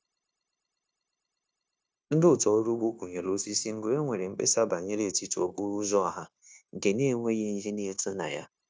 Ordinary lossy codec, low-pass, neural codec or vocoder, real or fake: none; none; codec, 16 kHz, 0.9 kbps, LongCat-Audio-Codec; fake